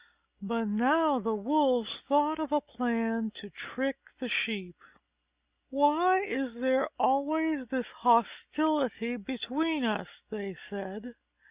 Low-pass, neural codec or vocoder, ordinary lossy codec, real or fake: 3.6 kHz; none; Opus, 64 kbps; real